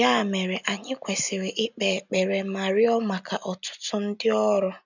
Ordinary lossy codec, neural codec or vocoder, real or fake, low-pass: none; none; real; 7.2 kHz